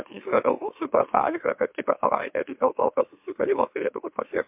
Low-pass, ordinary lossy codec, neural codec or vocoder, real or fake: 3.6 kHz; MP3, 32 kbps; autoencoder, 44.1 kHz, a latent of 192 numbers a frame, MeloTTS; fake